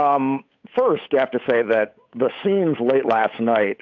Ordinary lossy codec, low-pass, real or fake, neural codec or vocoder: AAC, 48 kbps; 7.2 kHz; fake; codec, 16 kHz, 8 kbps, FunCodec, trained on Chinese and English, 25 frames a second